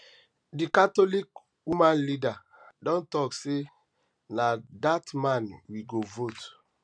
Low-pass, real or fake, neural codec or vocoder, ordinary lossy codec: 9.9 kHz; real; none; none